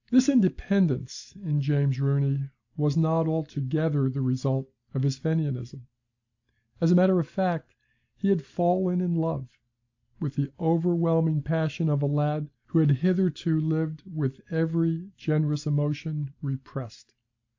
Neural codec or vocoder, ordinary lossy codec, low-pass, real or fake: none; AAC, 48 kbps; 7.2 kHz; real